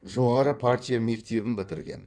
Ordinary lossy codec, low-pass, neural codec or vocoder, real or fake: none; 9.9 kHz; codec, 16 kHz in and 24 kHz out, 1.1 kbps, FireRedTTS-2 codec; fake